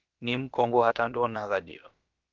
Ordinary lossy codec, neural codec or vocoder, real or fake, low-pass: Opus, 32 kbps; codec, 16 kHz, about 1 kbps, DyCAST, with the encoder's durations; fake; 7.2 kHz